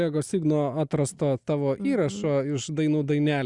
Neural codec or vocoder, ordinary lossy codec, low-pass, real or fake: none; Opus, 64 kbps; 10.8 kHz; real